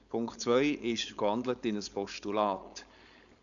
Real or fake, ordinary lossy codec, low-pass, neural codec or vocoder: fake; none; 7.2 kHz; codec, 16 kHz, 4.8 kbps, FACodec